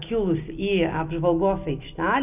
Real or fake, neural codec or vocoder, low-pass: real; none; 3.6 kHz